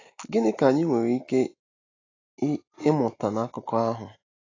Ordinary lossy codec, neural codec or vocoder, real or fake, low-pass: AAC, 32 kbps; none; real; 7.2 kHz